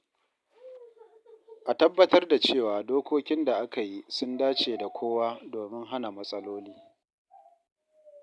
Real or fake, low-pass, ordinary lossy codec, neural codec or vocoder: real; none; none; none